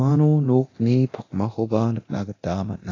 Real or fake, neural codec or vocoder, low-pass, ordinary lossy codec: fake; codec, 24 kHz, 0.9 kbps, DualCodec; 7.2 kHz; AAC, 32 kbps